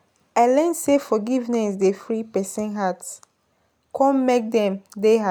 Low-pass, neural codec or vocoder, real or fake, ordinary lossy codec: none; none; real; none